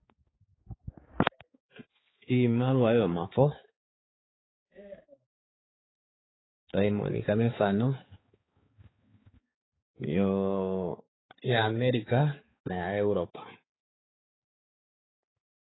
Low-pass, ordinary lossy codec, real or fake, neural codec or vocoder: 7.2 kHz; AAC, 16 kbps; fake; codec, 16 kHz, 4 kbps, X-Codec, HuBERT features, trained on balanced general audio